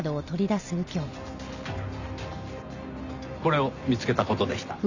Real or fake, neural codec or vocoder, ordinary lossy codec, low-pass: real; none; none; 7.2 kHz